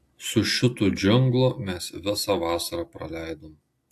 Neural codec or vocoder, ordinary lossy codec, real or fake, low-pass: none; AAC, 64 kbps; real; 14.4 kHz